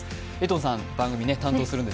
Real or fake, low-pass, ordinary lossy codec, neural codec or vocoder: real; none; none; none